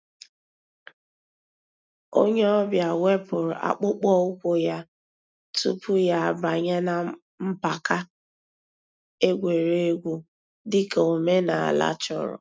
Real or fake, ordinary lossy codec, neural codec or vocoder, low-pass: real; none; none; none